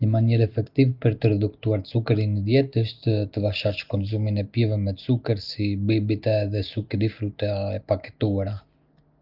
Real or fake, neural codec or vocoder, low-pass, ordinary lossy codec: real; none; 5.4 kHz; Opus, 24 kbps